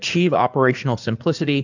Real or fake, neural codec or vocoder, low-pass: fake; codec, 16 kHz in and 24 kHz out, 2.2 kbps, FireRedTTS-2 codec; 7.2 kHz